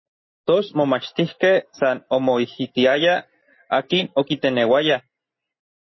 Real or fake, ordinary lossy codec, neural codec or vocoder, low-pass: real; MP3, 24 kbps; none; 7.2 kHz